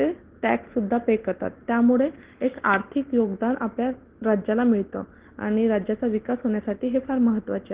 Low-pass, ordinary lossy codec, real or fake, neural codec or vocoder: 3.6 kHz; Opus, 16 kbps; real; none